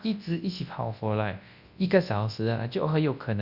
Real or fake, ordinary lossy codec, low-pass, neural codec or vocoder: fake; Opus, 64 kbps; 5.4 kHz; codec, 24 kHz, 0.9 kbps, WavTokenizer, large speech release